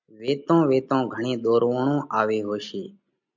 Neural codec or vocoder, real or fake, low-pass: none; real; 7.2 kHz